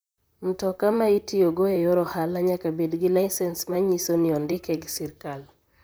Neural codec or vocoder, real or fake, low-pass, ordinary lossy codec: vocoder, 44.1 kHz, 128 mel bands, Pupu-Vocoder; fake; none; none